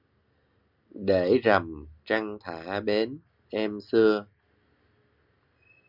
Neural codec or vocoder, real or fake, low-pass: none; real; 5.4 kHz